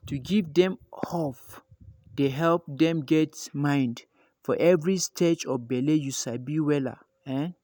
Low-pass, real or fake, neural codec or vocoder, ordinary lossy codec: none; real; none; none